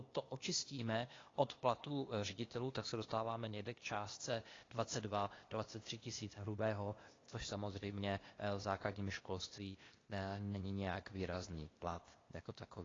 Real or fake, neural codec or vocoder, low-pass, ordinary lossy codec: fake; codec, 16 kHz, 0.8 kbps, ZipCodec; 7.2 kHz; AAC, 32 kbps